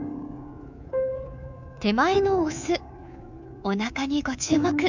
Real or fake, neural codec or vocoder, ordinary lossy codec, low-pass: fake; codec, 24 kHz, 3.1 kbps, DualCodec; none; 7.2 kHz